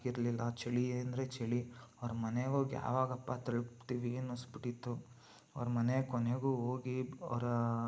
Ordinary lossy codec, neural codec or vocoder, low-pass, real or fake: none; none; none; real